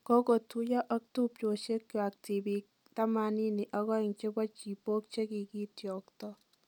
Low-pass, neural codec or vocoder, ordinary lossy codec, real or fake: 19.8 kHz; none; none; real